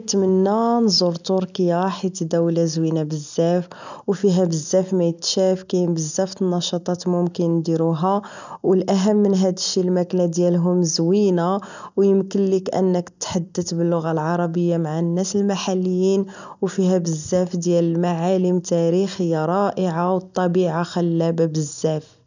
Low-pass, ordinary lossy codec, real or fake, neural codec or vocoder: 7.2 kHz; none; real; none